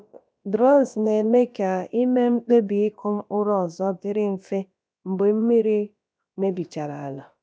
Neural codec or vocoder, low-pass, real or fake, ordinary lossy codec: codec, 16 kHz, about 1 kbps, DyCAST, with the encoder's durations; none; fake; none